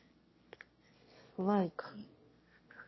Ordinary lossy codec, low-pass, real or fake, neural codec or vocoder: MP3, 24 kbps; 7.2 kHz; fake; autoencoder, 22.05 kHz, a latent of 192 numbers a frame, VITS, trained on one speaker